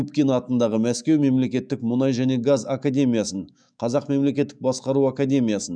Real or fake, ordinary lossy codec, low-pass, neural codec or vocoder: fake; none; 9.9 kHz; autoencoder, 48 kHz, 128 numbers a frame, DAC-VAE, trained on Japanese speech